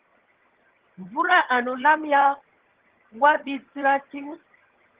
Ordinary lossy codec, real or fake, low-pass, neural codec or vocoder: Opus, 16 kbps; fake; 3.6 kHz; vocoder, 22.05 kHz, 80 mel bands, HiFi-GAN